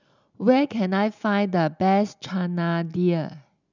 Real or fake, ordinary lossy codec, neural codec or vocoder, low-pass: real; none; none; 7.2 kHz